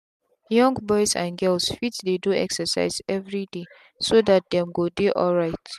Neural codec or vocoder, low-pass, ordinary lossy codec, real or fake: none; 14.4 kHz; MP3, 96 kbps; real